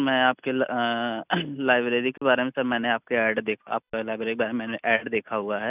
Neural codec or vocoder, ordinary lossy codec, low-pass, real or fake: none; none; 3.6 kHz; real